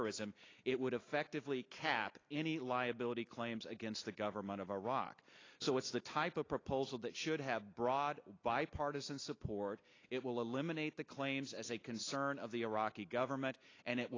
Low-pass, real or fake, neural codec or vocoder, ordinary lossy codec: 7.2 kHz; real; none; AAC, 32 kbps